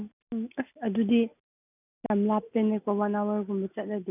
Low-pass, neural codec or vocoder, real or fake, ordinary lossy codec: 3.6 kHz; none; real; none